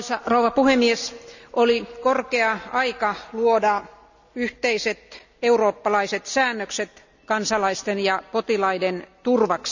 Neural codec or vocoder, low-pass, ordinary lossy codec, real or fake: none; 7.2 kHz; none; real